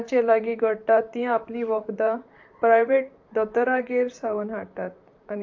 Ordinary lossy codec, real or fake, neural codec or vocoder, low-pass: AAC, 48 kbps; fake; vocoder, 44.1 kHz, 128 mel bands, Pupu-Vocoder; 7.2 kHz